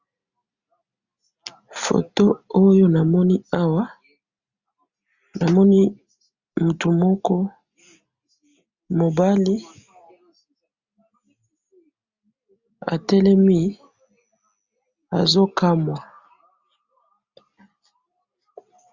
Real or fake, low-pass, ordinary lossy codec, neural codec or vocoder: real; 7.2 kHz; Opus, 64 kbps; none